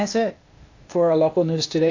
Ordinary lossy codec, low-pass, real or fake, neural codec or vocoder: AAC, 48 kbps; 7.2 kHz; fake; codec, 16 kHz, 0.8 kbps, ZipCodec